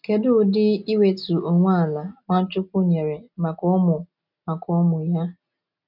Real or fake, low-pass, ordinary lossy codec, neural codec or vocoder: real; 5.4 kHz; none; none